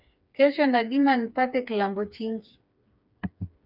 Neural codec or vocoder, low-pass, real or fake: codec, 16 kHz, 4 kbps, FreqCodec, smaller model; 5.4 kHz; fake